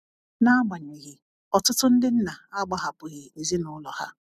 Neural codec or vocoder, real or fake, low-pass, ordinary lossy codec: none; real; 14.4 kHz; none